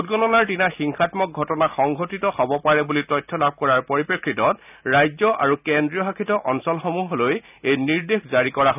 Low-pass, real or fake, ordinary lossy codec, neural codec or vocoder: 3.6 kHz; real; none; none